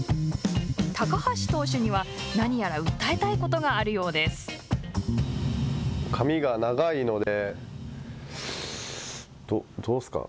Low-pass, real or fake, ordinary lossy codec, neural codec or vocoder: none; real; none; none